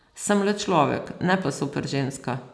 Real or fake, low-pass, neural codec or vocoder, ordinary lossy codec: real; none; none; none